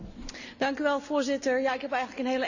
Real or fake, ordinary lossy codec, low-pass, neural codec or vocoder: real; none; 7.2 kHz; none